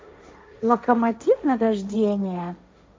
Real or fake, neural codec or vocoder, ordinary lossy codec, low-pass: fake; codec, 16 kHz, 1.1 kbps, Voila-Tokenizer; none; none